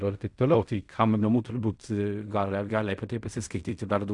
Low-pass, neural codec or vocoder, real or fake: 10.8 kHz; codec, 16 kHz in and 24 kHz out, 0.4 kbps, LongCat-Audio-Codec, fine tuned four codebook decoder; fake